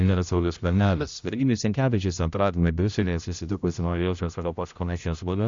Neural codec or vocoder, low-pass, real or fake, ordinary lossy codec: codec, 16 kHz, 0.5 kbps, X-Codec, HuBERT features, trained on general audio; 7.2 kHz; fake; Opus, 64 kbps